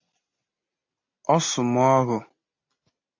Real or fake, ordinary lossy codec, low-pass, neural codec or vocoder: real; MP3, 32 kbps; 7.2 kHz; none